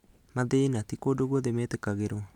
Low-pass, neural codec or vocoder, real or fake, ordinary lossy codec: 19.8 kHz; none; real; MP3, 96 kbps